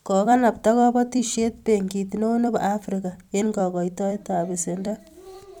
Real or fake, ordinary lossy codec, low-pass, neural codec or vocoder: fake; none; 19.8 kHz; vocoder, 44.1 kHz, 128 mel bands every 256 samples, BigVGAN v2